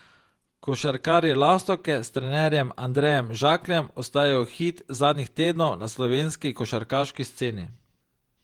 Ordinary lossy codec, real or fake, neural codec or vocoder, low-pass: Opus, 24 kbps; fake; vocoder, 48 kHz, 128 mel bands, Vocos; 19.8 kHz